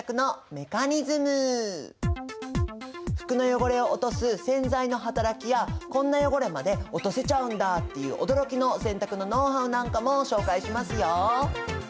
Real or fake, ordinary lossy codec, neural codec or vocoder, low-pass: real; none; none; none